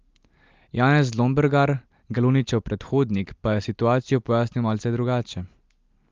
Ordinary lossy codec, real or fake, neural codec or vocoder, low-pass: Opus, 32 kbps; real; none; 7.2 kHz